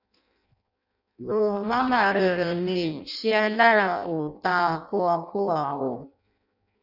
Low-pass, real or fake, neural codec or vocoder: 5.4 kHz; fake; codec, 16 kHz in and 24 kHz out, 0.6 kbps, FireRedTTS-2 codec